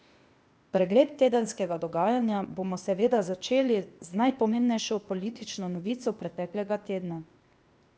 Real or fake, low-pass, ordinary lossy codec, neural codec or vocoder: fake; none; none; codec, 16 kHz, 0.8 kbps, ZipCodec